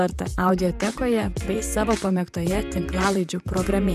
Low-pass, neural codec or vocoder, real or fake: 14.4 kHz; vocoder, 44.1 kHz, 128 mel bands, Pupu-Vocoder; fake